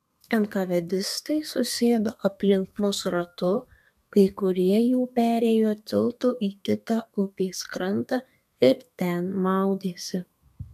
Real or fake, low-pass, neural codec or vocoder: fake; 14.4 kHz; codec, 32 kHz, 1.9 kbps, SNAC